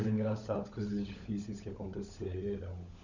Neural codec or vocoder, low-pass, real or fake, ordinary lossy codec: codec, 16 kHz, 16 kbps, FunCodec, trained on LibriTTS, 50 frames a second; 7.2 kHz; fake; none